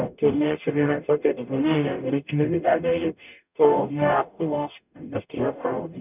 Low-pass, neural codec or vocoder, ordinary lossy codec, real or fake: 3.6 kHz; codec, 44.1 kHz, 0.9 kbps, DAC; none; fake